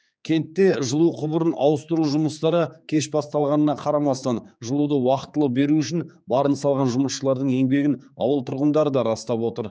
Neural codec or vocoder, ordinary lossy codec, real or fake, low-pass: codec, 16 kHz, 4 kbps, X-Codec, HuBERT features, trained on general audio; none; fake; none